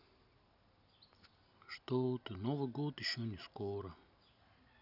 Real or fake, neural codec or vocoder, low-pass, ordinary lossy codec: real; none; 5.4 kHz; none